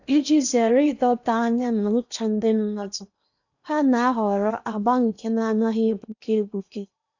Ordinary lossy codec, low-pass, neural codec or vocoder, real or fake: none; 7.2 kHz; codec, 16 kHz in and 24 kHz out, 0.8 kbps, FocalCodec, streaming, 65536 codes; fake